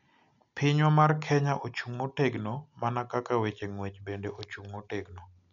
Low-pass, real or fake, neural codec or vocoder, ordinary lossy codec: 7.2 kHz; real; none; none